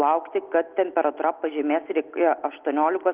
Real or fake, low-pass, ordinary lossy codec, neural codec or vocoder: real; 3.6 kHz; Opus, 32 kbps; none